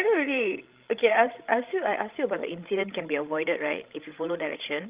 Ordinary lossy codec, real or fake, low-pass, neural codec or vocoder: Opus, 32 kbps; fake; 3.6 kHz; codec, 16 kHz, 16 kbps, FreqCodec, larger model